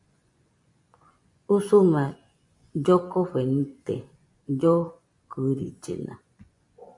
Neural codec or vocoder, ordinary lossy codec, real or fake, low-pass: vocoder, 24 kHz, 100 mel bands, Vocos; AAC, 64 kbps; fake; 10.8 kHz